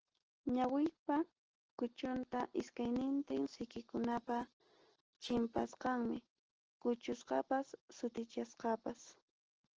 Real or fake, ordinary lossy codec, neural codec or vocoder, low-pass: real; Opus, 16 kbps; none; 7.2 kHz